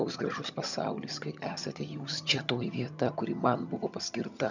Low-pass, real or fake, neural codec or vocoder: 7.2 kHz; fake; vocoder, 22.05 kHz, 80 mel bands, HiFi-GAN